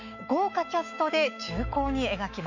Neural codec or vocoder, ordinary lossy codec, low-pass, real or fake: autoencoder, 48 kHz, 128 numbers a frame, DAC-VAE, trained on Japanese speech; MP3, 64 kbps; 7.2 kHz; fake